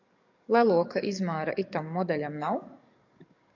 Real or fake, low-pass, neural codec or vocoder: fake; 7.2 kHz; codec, 44.1 kHz, 7.8 kbps, DAC